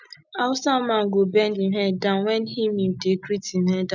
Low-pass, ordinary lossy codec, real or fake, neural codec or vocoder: 7.2 kHz; none; real; none